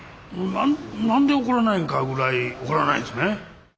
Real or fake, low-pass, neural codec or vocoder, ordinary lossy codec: real; none; none; none